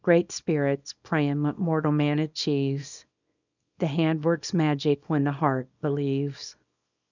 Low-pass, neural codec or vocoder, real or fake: 7.2 kHz; codec, 24 kHz, 0.9 kbps, WavTokenizer, small release; fake